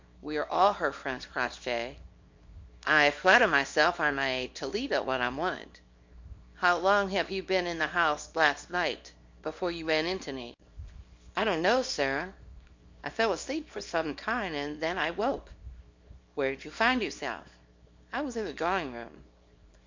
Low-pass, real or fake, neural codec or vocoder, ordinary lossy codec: 7.2 kHz; fake; codec, 24 kHz, 0.9 kbps, WavTokenizer, small release; MP3, 48 kbps